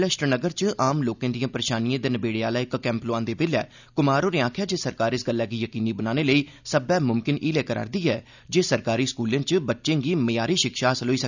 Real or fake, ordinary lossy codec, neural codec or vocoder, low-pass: real; none; none; 7.2 kHz